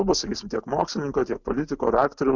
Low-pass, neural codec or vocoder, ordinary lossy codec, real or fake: 7.2 kHz; none; Opus, 64 kbps; real